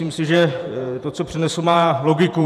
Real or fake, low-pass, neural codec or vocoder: fake; 14.4 kHz; vocoder, 48 kHz, 128 mel bands, Vocos